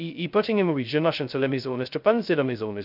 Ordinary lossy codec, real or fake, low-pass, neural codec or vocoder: none; fake; 5.4 kHz; codec, 16 kHz, 0.2 kbps, FocalCodec